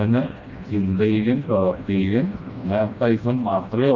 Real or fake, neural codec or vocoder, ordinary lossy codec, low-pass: fake; codec, 16 kHz, 1 kbps, FreqCodec, smaller model; Opus, 64 kbps; 7.2 kHz